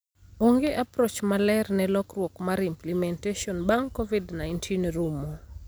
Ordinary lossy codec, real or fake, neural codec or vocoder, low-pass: none; real; none; none